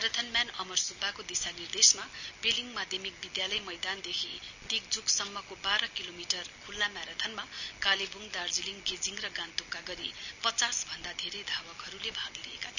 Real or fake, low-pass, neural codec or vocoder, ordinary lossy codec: real; 7.2 kHz; none; none